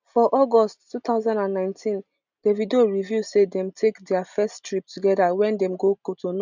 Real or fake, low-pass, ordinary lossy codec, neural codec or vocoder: real; 7.2 kHz; none; none